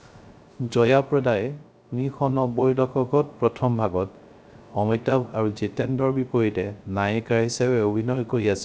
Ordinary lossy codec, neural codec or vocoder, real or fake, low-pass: none; codec, 16 kHz, 0.3 kbps, FocalCodec; fake; none